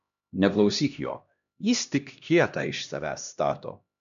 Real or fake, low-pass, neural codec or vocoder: fake; 7.2 kHz; codec, 16 kHz, 1 kbps, X-Codec, HuBERT features, trained on LibriSpeech